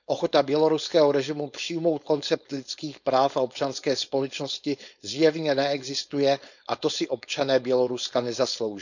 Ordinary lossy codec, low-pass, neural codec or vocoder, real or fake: none; 7.2 kHz; codec, 16 kHz, 4.8 kbps, FACodec; fake